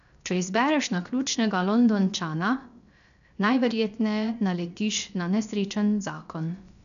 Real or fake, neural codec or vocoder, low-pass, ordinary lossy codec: fake; codec, 16 kHz, 0.7 kbps, FocalCodec; 7.2 kHz; MP3, 96 kbps